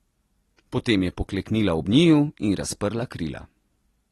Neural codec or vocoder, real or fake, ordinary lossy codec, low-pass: none; real; AAC, 32 kbps; 19.8 kHz